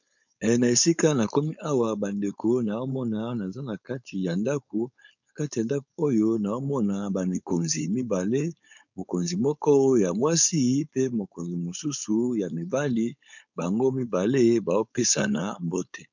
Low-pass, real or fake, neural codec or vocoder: 7.2 kHz; fake; codec, 16 kHz, 4.8 kbps, FACodec